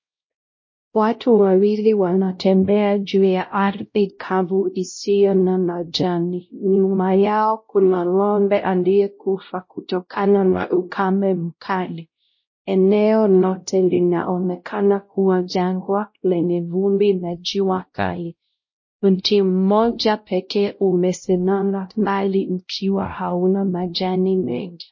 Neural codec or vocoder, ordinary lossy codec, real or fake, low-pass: codec, 16 kHz, 0.5 kbps, X-Codec, WavLM features, trained on Multilingual LibriSpeech; MP3, 32 kbps; fake; 7.2 kHz